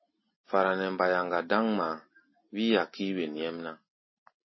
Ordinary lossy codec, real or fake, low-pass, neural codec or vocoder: MP3, 24 kbps; real; 7.2 kHz; none